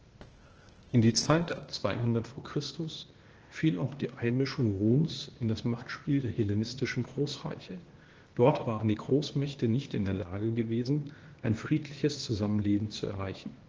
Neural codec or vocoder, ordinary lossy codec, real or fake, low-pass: codec, 16 kHz, 0.8 kbps, ZipCodec; Opus, 16 kbps; fake; 7.2 kHz